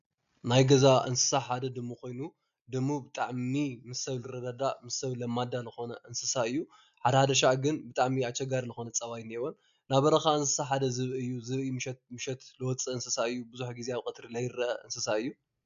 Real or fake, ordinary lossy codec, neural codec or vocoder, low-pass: real; AAC, 96 kbps; none; 7.2 kHz